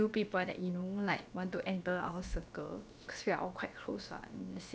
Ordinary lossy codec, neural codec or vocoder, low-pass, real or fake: none; codec, 16 kHz, about 1 kbps, DyCAST, with the encoder's durations; none; fake